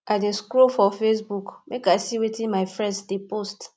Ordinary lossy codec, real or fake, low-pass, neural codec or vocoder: none; real; none; none